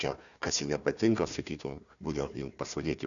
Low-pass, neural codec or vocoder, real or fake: 7.2 kHz; codec, 16 kHz, 1 kbps, FunCodec, trained on Chinese and English, 50 frames a second; fake